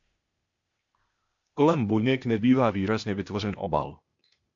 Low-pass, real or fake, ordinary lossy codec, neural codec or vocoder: 7.2 kHz; fake; MP3, 48 kbps; codec, 16 kHz, 0.8 kbps, ZipCodec